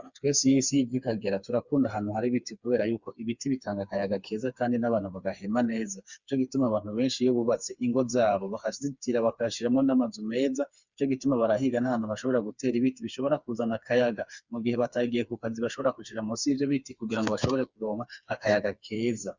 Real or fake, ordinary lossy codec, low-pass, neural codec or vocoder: fake; Opus, 64 kbps; 7.2 kHz; codec, 16 kHz, 4 kbps, FreqCodec, smaller model